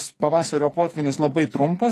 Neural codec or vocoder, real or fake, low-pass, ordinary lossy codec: codec, 44.1 kHz, 2.6 kbps, SNAC; fake; 14.4 kHz; AAC, 48 kbps